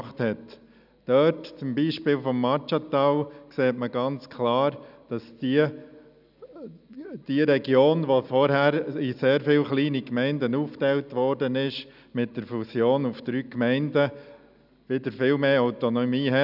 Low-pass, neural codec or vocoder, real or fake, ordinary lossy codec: 5.4 kHz; none; real; none